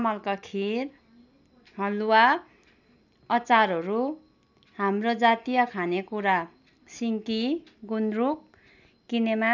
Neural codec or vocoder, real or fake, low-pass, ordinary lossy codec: none; real; 7.2 kHz; none